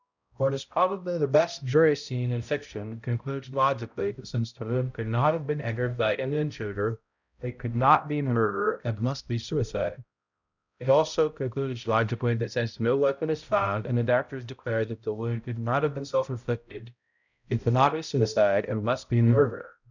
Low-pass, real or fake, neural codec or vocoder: 7.2 kHz; fake; codec, 16 kHz, 0.5 kbps, X-Codec, HuBERT features, trained on balanced general audio